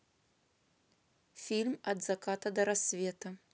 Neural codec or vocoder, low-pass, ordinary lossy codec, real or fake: none; none; none; real